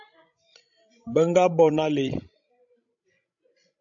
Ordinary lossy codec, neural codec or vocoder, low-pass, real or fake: AAC, 64 kbps; codec, 16 kHz, 16 kbps, FreqCodec, larger model; 7.2 kHz; fake